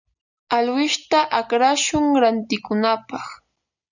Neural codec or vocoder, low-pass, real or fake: none; 7.2 kHz; real